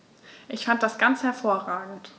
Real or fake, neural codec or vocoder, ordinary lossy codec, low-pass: real; none; none; none